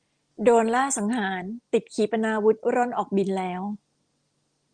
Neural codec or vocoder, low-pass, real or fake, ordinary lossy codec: none; 9.9 kHz; real; Opus, 24 kbps